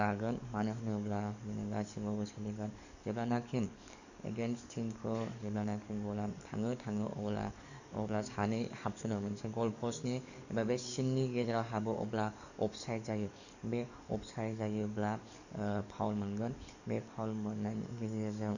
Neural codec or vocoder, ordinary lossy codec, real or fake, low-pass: codec, 44.1 kHz, 7.8 kbps, DAC; none; fake; 7.2 kHz